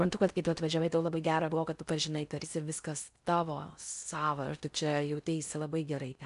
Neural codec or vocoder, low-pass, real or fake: codec, 16 kHz in and 24 kHz out, 0.6 kbps, FocalCodec, streaming, 4096 codes; 10.8 kHz; fake